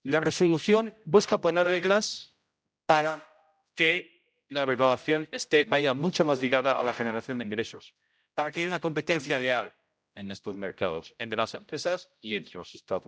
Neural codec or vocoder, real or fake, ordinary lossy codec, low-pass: codec, 16 kHz, 0.5 kbps, X-Codec, HuBERT features, trained on general audio; fake; none; none